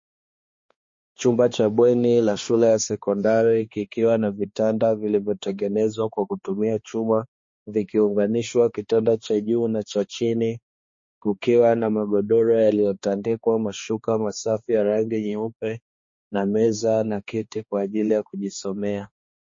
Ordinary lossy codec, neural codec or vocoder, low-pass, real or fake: MP3, 32 kbps; codec, 16 kHz, 2 kbps, X-Codec, HuBERT features, trained on balanced general audio; 7.2 kHz; fake